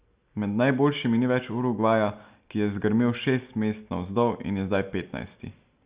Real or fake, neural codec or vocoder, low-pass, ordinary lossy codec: real; none; 3.6 kHz; Opus, 64 kbps